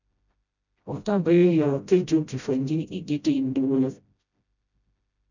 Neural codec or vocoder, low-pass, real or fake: codec, 16 kHz, 0.5 kbps, FreqCodec, smaller model; 7.2 kHz; fake